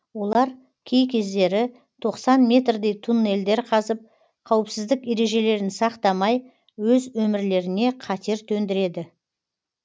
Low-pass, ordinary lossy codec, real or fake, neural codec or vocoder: none; none; real; none